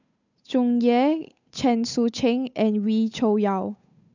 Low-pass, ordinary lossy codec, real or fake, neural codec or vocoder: 7.2 kHz; none; fake; codec, 16 kHz, 8 kbps, FunCodec, trained on Chinese and English, 25 frames a second